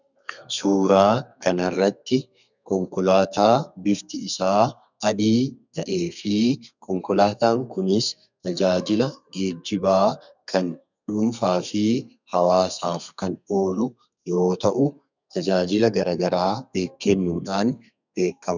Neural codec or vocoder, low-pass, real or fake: codec, 32 kHz, 1.9 kbps, SNAC; 7.2 kHz; fake